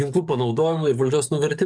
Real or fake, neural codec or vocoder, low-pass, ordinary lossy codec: fake; codec, 44.1 kHz, 7.8 kbps, DAC; 9.9 kHz; MP3, 96 kbps